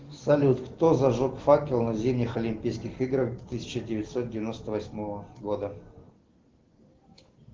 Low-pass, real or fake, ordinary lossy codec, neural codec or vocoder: 7.2 kHz; real; Opus, 16 kbps; none